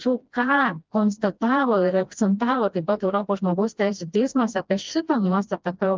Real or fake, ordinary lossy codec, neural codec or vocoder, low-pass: fake; Opus, 24 kbps; codec, 16 kHz, 1 kbps, FreqCodec, smaller model; 7.2 kHz